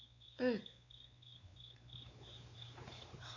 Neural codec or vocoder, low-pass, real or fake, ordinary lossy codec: codec, 16 kHz, 4 kbps, X-Codec, WavLM features, trained on Multilingual LibriSpeech; 7.2 kHz; fake; none